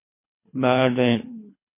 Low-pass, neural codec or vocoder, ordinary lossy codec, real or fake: 3.6 kHz; codec, 24 kHz, 3 kbps, HILCodec; MP3, 24 kbps; fake